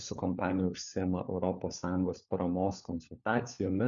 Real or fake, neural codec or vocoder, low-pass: fake; codec, 16 kHz, 4 kbps, FunCodec, trained on LibriTTS, 50 frames a second; 7.2 kHz